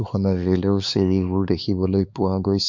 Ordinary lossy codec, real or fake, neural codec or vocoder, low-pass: MP3, 48 kbps; fake; codec, 16 kHz, 4 kbps, X-Codec, HuBERT features, trained on LibriSpeech; 7.2 kHz